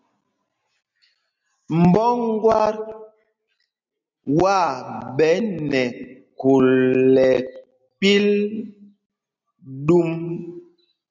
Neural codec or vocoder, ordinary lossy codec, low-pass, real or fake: none; MP3, 64 kbps; 7.2 kHz; real